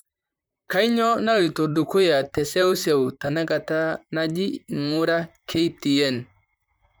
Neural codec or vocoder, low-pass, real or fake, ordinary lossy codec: vocoder, 44.1 kHz, 128 mel bands, Pupu-Vocoder; none; fake; none